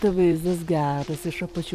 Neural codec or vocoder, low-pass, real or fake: vocoder, 44.1 kHz, 128 mel bands every 512 samples, BigVGAN v2; 14.4 kHz; fake